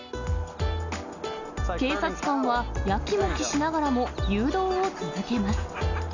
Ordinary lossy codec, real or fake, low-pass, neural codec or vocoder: none; real; 7.2 kHz; none